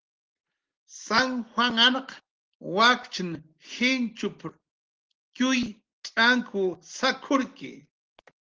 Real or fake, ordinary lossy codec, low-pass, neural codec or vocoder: real; Opus, 16 kbps; 7.2 kHz; none